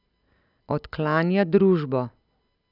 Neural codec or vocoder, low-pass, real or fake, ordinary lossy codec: none; 5.4 kHz; real; none